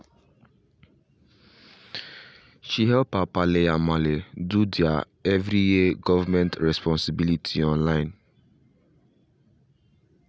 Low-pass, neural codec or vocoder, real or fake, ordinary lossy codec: none; none; real; none